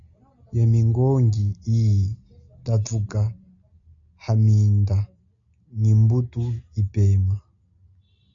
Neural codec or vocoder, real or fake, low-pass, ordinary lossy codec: none; real; 7.2 kHz; AAC, 64 kbps